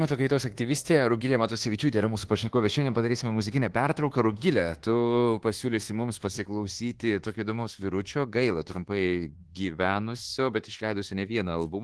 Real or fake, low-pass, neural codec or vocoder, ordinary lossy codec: fake; 10.8 kHz; codec, 24 kHz, 1.2 kbps, DualCodec; Opus, 16 kbps